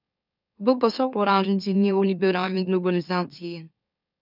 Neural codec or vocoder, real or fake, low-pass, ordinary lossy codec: autoencoder, 44.1 kHz, a latent of 192 numbers a frame, MeloTTS; fake; 5.4 kHz; none